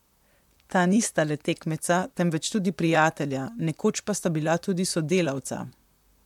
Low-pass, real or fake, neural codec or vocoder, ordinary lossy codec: 19.8 kHz; fake; vocoder, 44.1 kHz, 128 mel bands, Pupu-Vocoder; MP3, 96 kbps